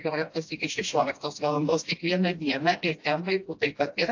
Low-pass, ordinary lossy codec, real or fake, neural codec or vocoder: 7.2 kHz; AAC, 48 kbps; fake; codec, 16 kHz, 1 kbps, FreqCodec, smaller model